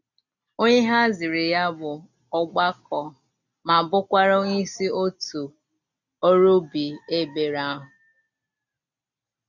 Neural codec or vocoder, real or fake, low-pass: none; real; 7.2 kHz